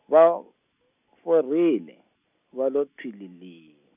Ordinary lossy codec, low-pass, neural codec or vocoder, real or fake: none; 3.6 kHz; none; real